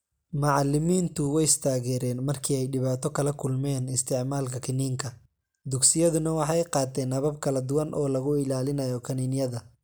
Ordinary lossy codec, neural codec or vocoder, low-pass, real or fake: none; none; none; real